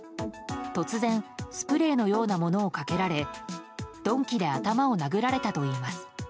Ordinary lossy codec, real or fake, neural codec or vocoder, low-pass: none; real; none; none